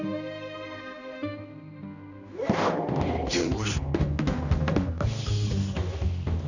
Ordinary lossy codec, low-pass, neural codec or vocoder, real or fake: none; 7.2 kHz; codec, 16 kHz, 1 kbps, X-Codec, HuBERT features, trained on general audio; fake